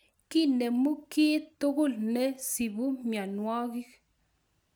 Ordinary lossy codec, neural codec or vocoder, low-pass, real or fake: none; none; none; real